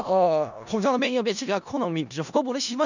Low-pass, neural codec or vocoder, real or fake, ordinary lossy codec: 7.2 kHz; codec, 16 kHz in and 24 kHz out, 0.4 kbps, LongCat-Audio-Codec, four codebook decoder; fake; none